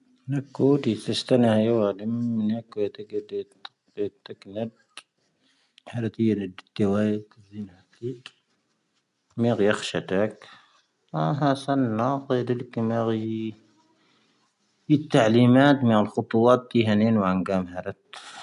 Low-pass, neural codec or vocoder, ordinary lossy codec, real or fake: 10.8 kHz; none; none; real